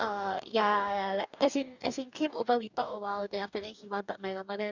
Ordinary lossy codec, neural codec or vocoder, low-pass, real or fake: none; codec, 44.1 kHz, 2.6 kbps, DAC; 7.2 kHz; fake